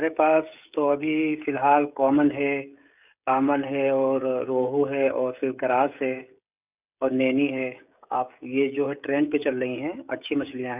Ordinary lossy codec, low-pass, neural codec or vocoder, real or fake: none; 3.6 kHz; codec, 16 kHz, 16 kbps, FreqCodec, smaller model; fake